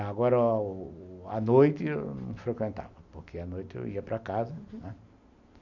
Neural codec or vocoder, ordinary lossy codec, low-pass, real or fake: none; none; 7.2 kHz; real